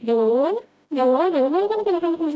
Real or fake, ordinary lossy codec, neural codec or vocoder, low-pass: fake; none; codec, 16 kHz, 0.5 kbps, FreqCodec, smaller model; none